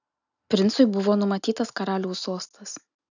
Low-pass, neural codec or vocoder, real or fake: 7.2 kHz; none; real